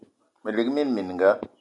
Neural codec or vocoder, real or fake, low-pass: none; real; 10.8 kHz